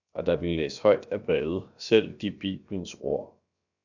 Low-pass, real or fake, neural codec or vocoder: 7.2 kHz; fake; codec, 16 kHz, about 1 kbps, DyCAST, with the encoder's durations